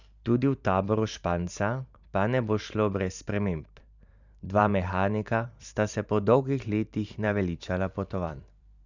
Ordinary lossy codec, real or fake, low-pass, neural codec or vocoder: none; fake; 7.2 kHz; vocoder, 44.1 kHz, 128 mel bands every 512 samples, BigVGAN v2